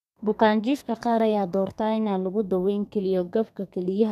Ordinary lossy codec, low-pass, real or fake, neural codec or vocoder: none; 14.4 kHz; fake; codec, 32 kHz, 1.9 kbps, SNAC